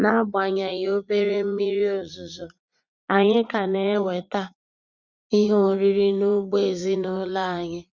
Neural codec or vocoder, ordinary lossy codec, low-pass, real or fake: vocoder, 44.1 kHz, 80 mel bands, Vocos; Opus, 64 kbps; 7.2 kHz; fake